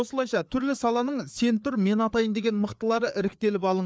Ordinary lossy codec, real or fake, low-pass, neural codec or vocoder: none; fake; none; codec, 16 kHz, 4 kbps, FreqCodec, larger model